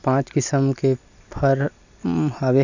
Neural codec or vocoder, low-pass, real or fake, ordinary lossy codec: none; 7.2 kHz; real; none